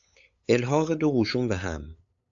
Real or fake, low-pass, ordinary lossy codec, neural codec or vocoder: fake; 7.2 kHz; AAC, 64 kbps; codec, 16 kHz, 8 kbps, FunCodec, trained on LibriTTS, 25 frames a second